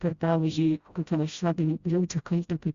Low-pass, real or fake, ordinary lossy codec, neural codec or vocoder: 7.2 kHz; fake; Opus, 64 kbps; codec, 16 kHz, 0.5 kbps, FreqCodec, smaller model